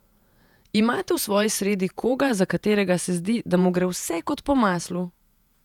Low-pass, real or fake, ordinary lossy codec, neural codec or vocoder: 19.8 kHz; fake; none; vocoder, 48 kHz, 128 mel bands, Vocos